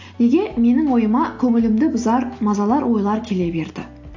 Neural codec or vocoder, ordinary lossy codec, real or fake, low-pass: none; AAC, 48 kbps; real; 7.2 kHz